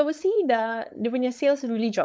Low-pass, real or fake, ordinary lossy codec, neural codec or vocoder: none; fake; none; codec, 16 kHz, 4.8 kbps, FACodec